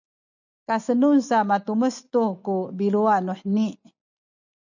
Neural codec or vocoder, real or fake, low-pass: none; real; 7.2 kHz